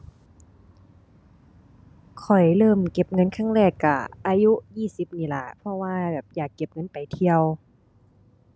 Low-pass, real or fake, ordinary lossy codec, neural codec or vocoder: none; real; none; none